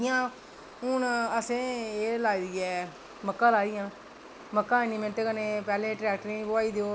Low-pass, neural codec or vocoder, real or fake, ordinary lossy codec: none; none; real; none